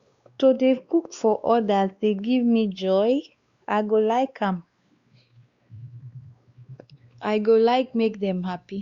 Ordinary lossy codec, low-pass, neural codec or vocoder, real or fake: Opus, 64 kbps; 7.2 kHz; codec, 16 kHz, 2 kbps, X-Codec, WavLM features, trained on Multilingual LibriSpeech; fake